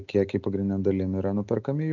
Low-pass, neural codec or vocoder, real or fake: 7.2 kHz; none; real